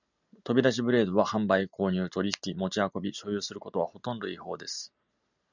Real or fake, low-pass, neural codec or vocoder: real; 7.2 kHz; none